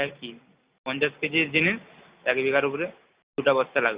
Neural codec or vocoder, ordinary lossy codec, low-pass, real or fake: none; Opus, 64 kbps; 3.6 kHz; real